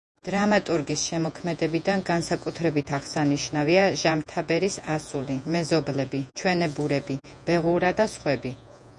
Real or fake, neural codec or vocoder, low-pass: fake; vocoder, 48 kHz, 128 mel bands, Vocos; 10.8 kHz